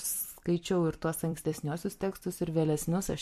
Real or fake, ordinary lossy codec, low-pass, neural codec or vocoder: fake; MP3, 64 kbps; 14.4 kHz; vocoder, 44.1 kHz, 128 mel bands every 256 samples, BigVGAN v2